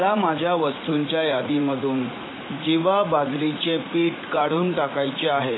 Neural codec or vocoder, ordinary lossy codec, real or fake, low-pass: vocoder, 44.1 kHz, 80 mel bands, Vocos; AAC, 16 kbps; fake; 7.2 kHz